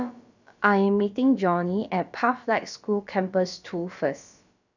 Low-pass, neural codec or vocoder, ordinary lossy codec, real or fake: 7.2 kHz; codec, 16 kHz, about 1 kbps, DyCAST, with the encoder's durations; none; fake